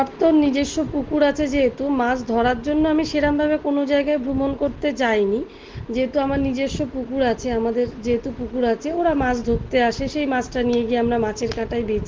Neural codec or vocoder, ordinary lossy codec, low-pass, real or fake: none; Opus, 16 kbps; 7.2 kHz; real